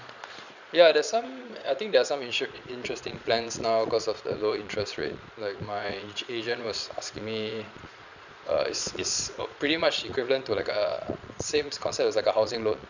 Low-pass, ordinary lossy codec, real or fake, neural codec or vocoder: 7.2 kHz; none; fake; vocoder, 22.05 kHz, 80 mel bands, Vocos